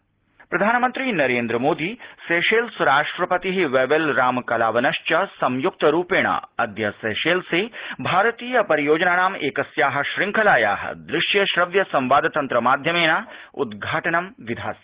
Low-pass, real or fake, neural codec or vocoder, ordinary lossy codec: 3.6 kHz; real; none; Opus, 16 kbps